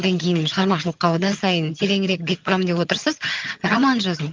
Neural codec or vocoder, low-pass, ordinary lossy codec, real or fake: vocoder, 22.05 kHz, 80 mel bands, HiFi-GAN; 7.2 kHz; Opus, 32 kbps; fake